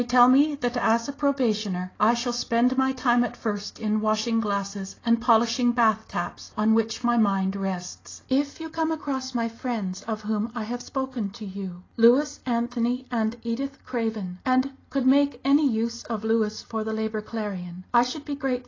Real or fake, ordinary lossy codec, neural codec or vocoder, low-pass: real; AAC, 32 kbps; none; 7.2 kHz